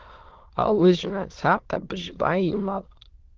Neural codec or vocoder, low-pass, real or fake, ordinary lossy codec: autoencoder, 22.05 kHz, a latent of 192 numbers a frame, VITS, trained on many speakers; 7.2 kHz; fake; Opus, 16 kbps